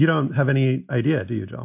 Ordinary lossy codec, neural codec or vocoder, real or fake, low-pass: MP3, 32 kbps; none; real; 3.6 kHz